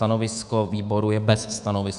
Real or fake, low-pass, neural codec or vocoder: fake; 10.8 kHz; codec, 24 kHz, 3.1 kbps, DualCodec